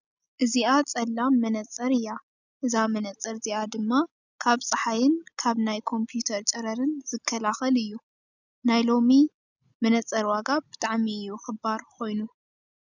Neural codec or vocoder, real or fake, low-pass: none; real; 7.2 kHz